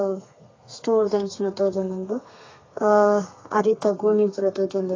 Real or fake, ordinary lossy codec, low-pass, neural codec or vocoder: fake; AAC, 32 kbps; 7.2 kHz; codec, 32 kHz, 1.9 kbps, SNAC